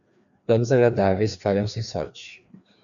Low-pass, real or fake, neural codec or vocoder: 7.2 kHz; fake; codec, 16 kHz, 2 kbps, FreqCodec, larger model